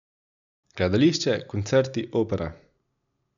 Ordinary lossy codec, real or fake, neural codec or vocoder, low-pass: none; real; none; 7.2 kHz